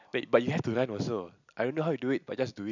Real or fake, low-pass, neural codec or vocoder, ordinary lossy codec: real; 7.2 kHz; none; none